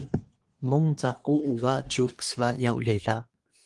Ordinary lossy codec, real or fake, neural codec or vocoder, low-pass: Opus, 24 kbps; fake; codec, 24 kHz, 1 kbps, SNAC; 10.8 kHz